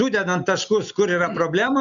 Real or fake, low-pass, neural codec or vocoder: real; 7.2 kHz; none